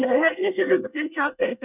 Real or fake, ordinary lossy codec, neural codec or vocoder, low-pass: fake; none; codec, 24 kHz, 1 kbps, SNAC; 3.6 kHz